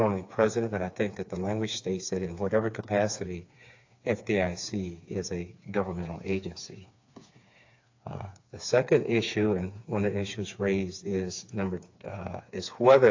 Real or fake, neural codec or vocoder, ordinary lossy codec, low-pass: fake; codec, 16 kHz, 4 kbps, FreqCodec, smaller model; AAC, 48 kbps; 7.2 kHz